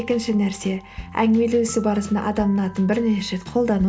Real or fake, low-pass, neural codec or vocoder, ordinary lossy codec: real; none; none; none